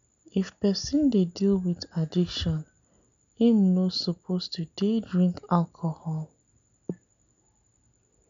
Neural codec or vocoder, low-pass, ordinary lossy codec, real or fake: none; 7.2 kHz; none; real